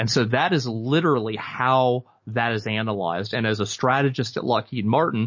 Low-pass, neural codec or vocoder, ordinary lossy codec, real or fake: 7.2 kHz; none; MP3, 32 kbps; real